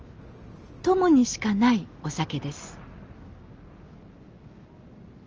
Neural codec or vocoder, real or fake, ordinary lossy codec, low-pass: none; real; Opus, 24 kbps; 7.2 kHz